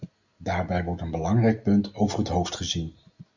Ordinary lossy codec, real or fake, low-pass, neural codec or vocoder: Opus, 64 kbps; real; 7.2 kHz; none